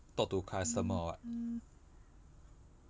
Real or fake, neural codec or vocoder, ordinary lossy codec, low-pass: real; none; none; none